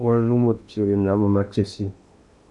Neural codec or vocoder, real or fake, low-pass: codec, 16 kHz in and 24 kHz out, 0.8 kbps, FocalCodec, streaming, 65536 codes; fake; 10.8 kHz